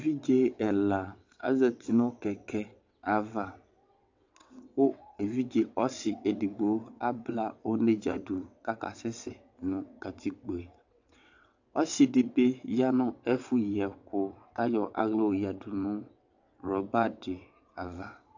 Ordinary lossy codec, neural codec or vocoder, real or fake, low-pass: AAC, 48 kbps; vocoder, 22.05 kHz, 80 mel bands, WaveNeXt; fake; 7.2 kHz